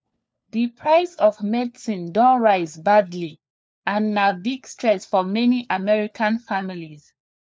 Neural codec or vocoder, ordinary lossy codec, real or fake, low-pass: codec, 16 kHz, 4 kbps, FunCodec, trained on LibriTTS, 50 frames a second; none; fake; none